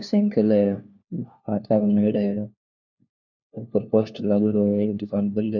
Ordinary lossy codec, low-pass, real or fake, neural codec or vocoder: none; 7.2 kHz; fake; codec, 16 kHz, 1 kbps, FunCodec, trained on LibriTTS, 50 frames a second